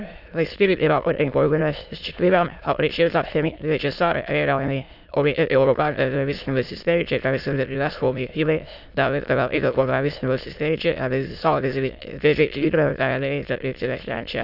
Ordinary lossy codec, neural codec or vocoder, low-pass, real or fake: none; autoencoder, 22.05 kHz, a latent of 192 numbers a frame, VITS, trained on many speakers; 5.4 kHz; fake